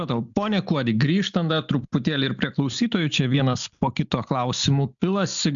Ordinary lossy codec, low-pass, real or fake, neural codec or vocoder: MP3, 96 kbps; 7.2 kHz; real; none